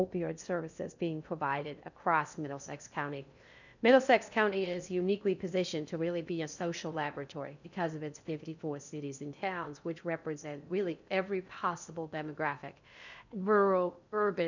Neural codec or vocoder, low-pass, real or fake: codec, 16 kHz in and 24 kHz out, 0.6 kbps, FocalCodec, streaming, 2048 codes; 7.2 kHz; fake